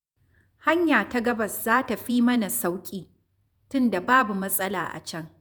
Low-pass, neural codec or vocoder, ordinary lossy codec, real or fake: none; vocoder, 48 kHz, 128 mel bands, Vocos; none; fake